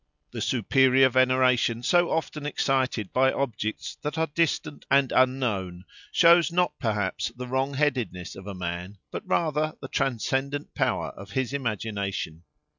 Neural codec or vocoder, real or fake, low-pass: none; real; 7.2 kHz